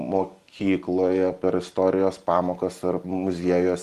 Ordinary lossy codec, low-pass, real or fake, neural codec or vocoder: Opus, 24 kbps; 14.4 kHz; real; none